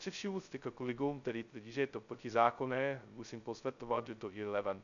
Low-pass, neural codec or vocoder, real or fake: 7.2 kHz; codec, 16 kHz, 0.2 kbps, FocalCodec; fake